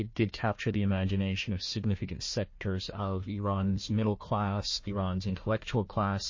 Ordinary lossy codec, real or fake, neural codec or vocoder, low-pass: MP3, 32 kbps; fake; codec, 16 kHz, 1 kbps, FunCodec, trained on Chinese and English, 50 frames a second; 7.2 kHz